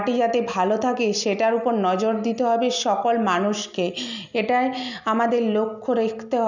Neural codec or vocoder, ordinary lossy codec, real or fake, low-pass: none; none; real; 7.2 kHz